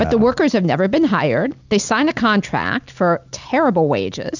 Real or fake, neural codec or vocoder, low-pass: real; none; 7.2 kHz